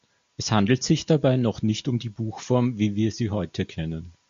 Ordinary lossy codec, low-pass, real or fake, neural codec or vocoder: MP3, 48 kbps; 7.2 kHz; real; none